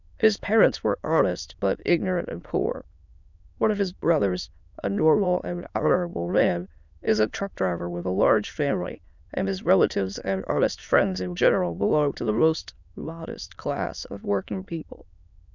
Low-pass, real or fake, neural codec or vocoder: 7.2 kHz; fake; autoencoder, 22.05 kHz, a latent of 192 numbers a frame, VITS, trained on many speakers